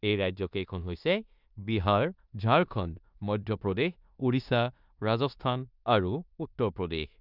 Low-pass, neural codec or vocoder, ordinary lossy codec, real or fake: 5.4 kHz; codec, 16 kHz in and 24 kHz out, 0.9 kbps, LongCat-Audio-Codec, four codebook decoder; none; fake